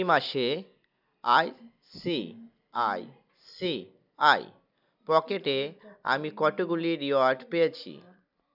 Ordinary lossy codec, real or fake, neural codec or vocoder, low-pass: none; real; none; 5.4 kHz